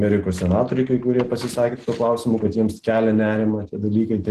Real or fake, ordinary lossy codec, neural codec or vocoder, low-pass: real; Opus, 16 kbps; none; 14.4 kHz